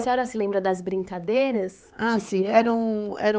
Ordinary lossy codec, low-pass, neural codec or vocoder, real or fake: none; none; codec, 16 kHz, 4 kbps, X-Codec, HuBERT features, trained on LibriSpeech; fake